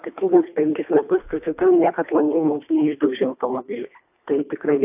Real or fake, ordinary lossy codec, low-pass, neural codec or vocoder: fake; AAC, 32 kbps; 3.6 kHz; codec, 24 kHz, 1.5 kbps, HILCodec